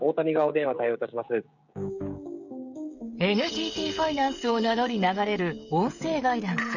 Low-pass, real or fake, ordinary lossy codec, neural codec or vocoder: 7.2 kHz; fake; none; codec, 16 kHz, 16 kbps, FreqCodec, smaller model